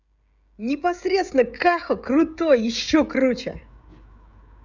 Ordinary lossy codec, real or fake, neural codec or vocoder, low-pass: none; fake; codec, 16 kHz, 16 kbps, FreqCodec, smaller model; 7.2 kHz